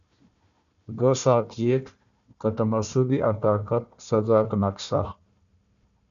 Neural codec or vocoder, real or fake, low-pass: codec, 16 kHz, 1 kbps, FunCodec, trained on Chinese and English, 50 frames a second; fake; 7.2 kHz